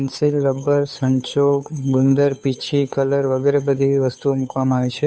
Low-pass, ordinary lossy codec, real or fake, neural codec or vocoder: none; none; fake; codec, 16 kHz, 2 kbps, FunCodec, trained on Chinese and English, 25 frames a second